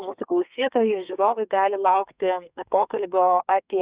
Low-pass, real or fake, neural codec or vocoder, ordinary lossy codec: 3.6 kHz; fake; codec, 32 kHz, 1.9 kbps, SNAC; Opus, 64 kbps